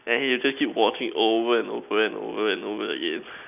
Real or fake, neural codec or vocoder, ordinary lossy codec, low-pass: real; none; none; 3.6 kHz